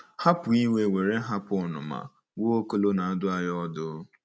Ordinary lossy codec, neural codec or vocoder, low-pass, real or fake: none; none; none; real